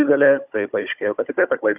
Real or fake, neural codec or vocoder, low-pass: fake; codec, 16 kHz, 16 kbps, FunCodec, trained on Chinese and English, 50 frames a second; 3.6 kHz